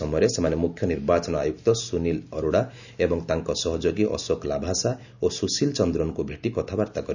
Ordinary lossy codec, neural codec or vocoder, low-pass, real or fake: none; none; 7.2 kHz; real